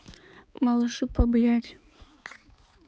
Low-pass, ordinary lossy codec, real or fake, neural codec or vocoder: none; none; fake; codec, 16 kHz, 4 kbps, X-Codec, HuBERT features, trained on balanced general audio